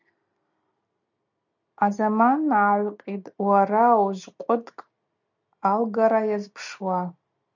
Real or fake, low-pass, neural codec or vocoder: real; 7.2 kHz; none